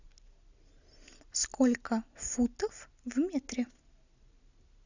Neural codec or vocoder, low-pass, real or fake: none; 7.2 kHz; real